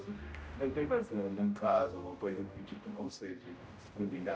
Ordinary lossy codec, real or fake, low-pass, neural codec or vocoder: none; fake; none; codec, 16 kHz, 0.5 kbps, X-Codec, HuBERT features, trained on general audio